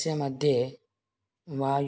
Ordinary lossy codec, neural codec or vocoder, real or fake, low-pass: none; none; real; none